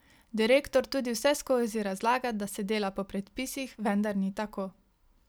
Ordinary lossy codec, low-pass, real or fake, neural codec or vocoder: none; none; real; none